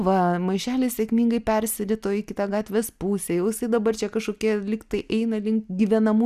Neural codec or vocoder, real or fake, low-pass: none; real; 14.4 kHz